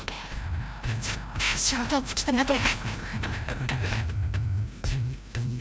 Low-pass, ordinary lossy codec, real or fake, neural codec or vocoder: none; none; fake; codec, 16 kHz, 0.5 kbps, FreqCodec, larger model